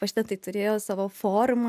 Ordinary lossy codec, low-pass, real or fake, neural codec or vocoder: MP3, 96 kbps; 14.4 kHz; real; none